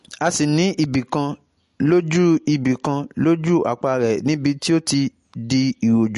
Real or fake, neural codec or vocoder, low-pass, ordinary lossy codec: real; none; 14.4 kHz; MP3, 48 kbps